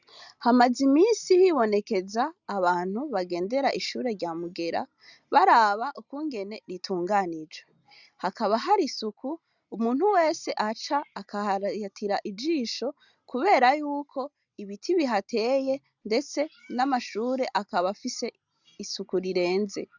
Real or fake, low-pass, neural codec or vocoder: real; 7.2 kHz; none